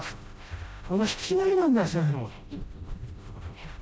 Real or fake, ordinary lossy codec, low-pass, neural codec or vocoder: fake; none; none; codec, 16 kHz, 0.5 kbps, FreqCodec, smaller model